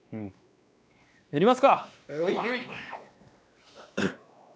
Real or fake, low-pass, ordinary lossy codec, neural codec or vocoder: fake; none; none; codec, 16 kHz, 2 kbps, X-Codec, WavLM features, trained on Multilingual LibriSpeech